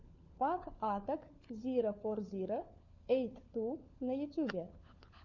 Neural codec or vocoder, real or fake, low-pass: codec, 16 kHz, 4 kbps, FunCodec, trained on LibriTTS, 50 frames a second; fake; 7.2 kHz